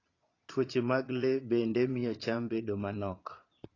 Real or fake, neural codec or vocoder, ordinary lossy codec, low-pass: fake; vocoder, 22.05 kHz, 80 mel bands, Vocos; AAC, 32 kbps; 7.2 kHz